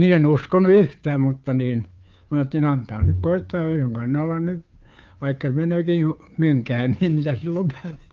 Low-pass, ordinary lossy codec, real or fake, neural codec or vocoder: 7.2 kHz; Opus, 24 kbps; fake; codec, 16 kHz, 2 kbps, FunCodec, trained on Chinese and English, 25 frames a second